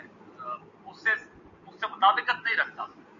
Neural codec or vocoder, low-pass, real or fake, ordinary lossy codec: none; 7.2 kHz; real; MP3, 96 kbps